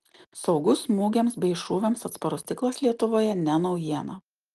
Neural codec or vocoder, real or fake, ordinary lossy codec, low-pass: none; real; Opus, 24 kbps; 14.4 kHz